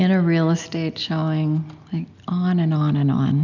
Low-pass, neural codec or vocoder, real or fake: 7.2 kHz; none; real